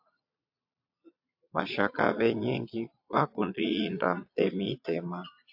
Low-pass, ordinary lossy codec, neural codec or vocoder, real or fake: 5.4 kHz; AAC, 48 kbps; vocoder, 22.05 kHz, 80 mel bands, Vocos; fake